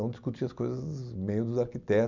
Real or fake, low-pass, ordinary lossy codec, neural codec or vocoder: real; 7.2 kHz; none; none